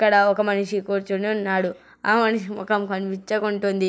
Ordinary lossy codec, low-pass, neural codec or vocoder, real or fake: none; none; none; real